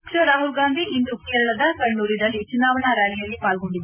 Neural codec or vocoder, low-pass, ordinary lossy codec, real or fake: none; 3.6 kHz; none; real